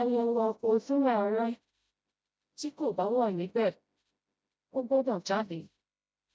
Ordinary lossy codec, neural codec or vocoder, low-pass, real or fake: none; codec, 16 kHz, 0.5 kbps, FreqCodec, smaller model; none; fake